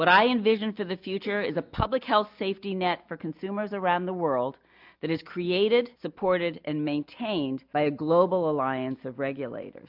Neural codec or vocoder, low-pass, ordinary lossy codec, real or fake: none; 5.4 kHz; MP3, 48 kbps; real